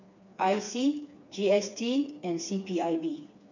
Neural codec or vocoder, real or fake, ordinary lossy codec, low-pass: codec, 16 kHz, 4 kbps, FreqCodec, smaller model; fake; none; 7.2 kHz